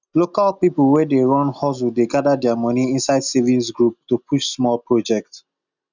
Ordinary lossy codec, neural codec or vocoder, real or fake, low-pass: none; none; real; 7.2 kHz